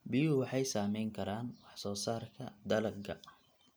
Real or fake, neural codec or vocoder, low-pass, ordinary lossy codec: real; none; none; none